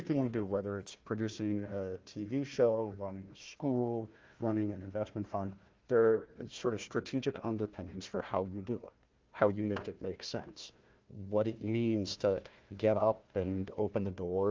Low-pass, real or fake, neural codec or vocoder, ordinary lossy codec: 7.2 kHz; fake; codec, 16 kHz, 1 kbps, FunCodec, trained on Chinese and English, 50 frames a second; Opus, 24 kbps